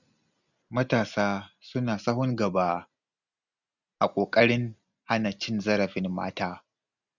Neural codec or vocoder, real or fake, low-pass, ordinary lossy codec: none; real; 7.2 kHz; none